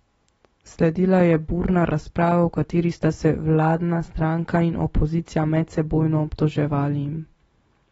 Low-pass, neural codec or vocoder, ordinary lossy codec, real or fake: 19.8 kHz; none; AAC, 24 kbps; real